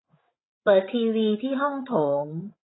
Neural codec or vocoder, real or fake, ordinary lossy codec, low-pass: codec, 16 kHz, 16 kbps, FreqCodec, larger model; fake; AAC, 16 kbps; 7.2 kHz